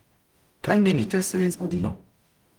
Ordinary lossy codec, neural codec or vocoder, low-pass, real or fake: Opus, 32 kbps; codec, 44.1 kHz, 0.9 kbps, DAC; 19.8 kHz; fake